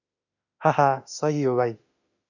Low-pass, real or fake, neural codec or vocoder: 7.2 kHz; fake; autoencoder, 48 kHz, 32 numbers a frame, DAC-VAE, trained on Japanese speech